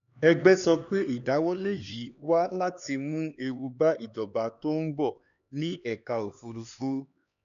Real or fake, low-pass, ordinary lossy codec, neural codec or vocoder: fake; 7.2 kHz; none; codec, 16 kHz, 2 kbps, X-Codec, HuBERT features, trained on LibriSpeech